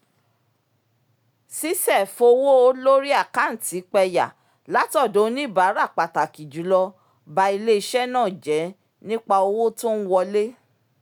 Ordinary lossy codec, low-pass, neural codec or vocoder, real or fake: none; none; none; real